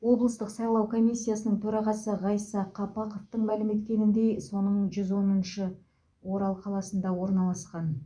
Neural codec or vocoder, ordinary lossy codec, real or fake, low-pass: vocoder, 24 kHz, 100 mel bands, Vocos; none; fake; 9.9 kHz